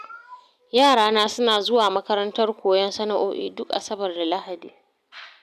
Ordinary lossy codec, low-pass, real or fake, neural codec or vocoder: none; 14.4 kHz; real; none